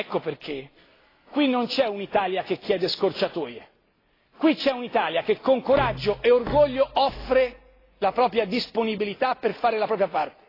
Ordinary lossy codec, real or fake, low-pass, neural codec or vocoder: AAC, 24 kbps; real; 5.4 kHz; none